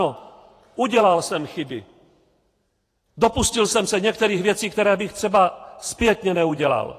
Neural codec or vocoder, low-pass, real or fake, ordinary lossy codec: vocoder, 48 kHz, 128 mel bands, Vocos; 14.4 kHz; fake; AAC, 48 kbps